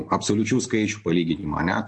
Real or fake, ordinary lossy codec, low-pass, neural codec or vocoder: real; MP3, 48 kbps; 10.8 kHz; none